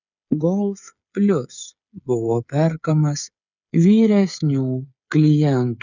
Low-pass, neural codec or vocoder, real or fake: 7.2 kHz; codec, 16 kHz, 8 kbps, FreqCodec, smaller model; fake